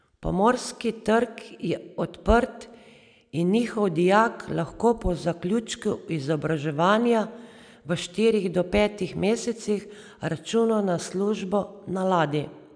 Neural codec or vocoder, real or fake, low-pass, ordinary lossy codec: none; real; 9.9 kHz; none